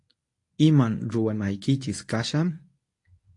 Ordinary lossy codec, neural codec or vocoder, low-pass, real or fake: AAC, 48 kbps; codec, 24 kHz, 0.9 kbps, WavTokenizer, medium speech release version 1; 10.8 kHz; fake